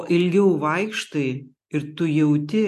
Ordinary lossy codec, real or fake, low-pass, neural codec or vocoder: AAC, 64 kbps; real; 14.4 kHz; none